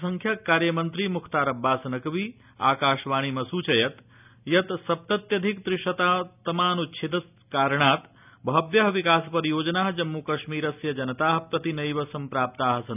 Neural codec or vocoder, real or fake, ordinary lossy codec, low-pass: none; real; none; 3.6 kHz